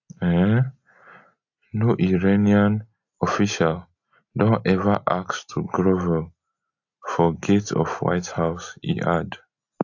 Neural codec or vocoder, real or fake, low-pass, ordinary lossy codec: none; real; 7.2 kHz; AAC, 48 kbps